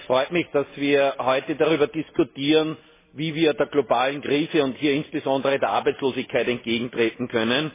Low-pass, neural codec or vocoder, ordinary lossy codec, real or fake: 3.6 kHz; none; MP3, 16 kbps; real